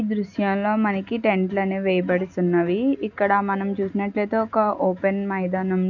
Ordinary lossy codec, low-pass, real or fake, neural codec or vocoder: none; 7.2 kHz; real; none